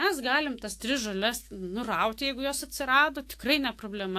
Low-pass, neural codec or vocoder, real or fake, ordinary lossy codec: 14.4 kHz; autoencoder, 48 kHz, 128 numbers a frame, DAC-VAE, trained on Japanese speech; fake; AAC, 64 kbps